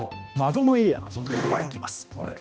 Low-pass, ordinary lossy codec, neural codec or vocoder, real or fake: none; none; codec, 16 kHz, 1 kbps, X-Codec, HuBERT features, trained on balanced general audio; fake